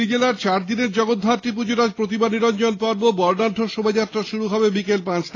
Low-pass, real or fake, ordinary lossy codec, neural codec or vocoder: 7.2 kHz; real; AAC, 32 kbps; none